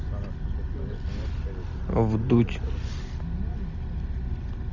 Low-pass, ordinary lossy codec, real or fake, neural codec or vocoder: 7.2 kHz; Opus, 64 kbps; real; none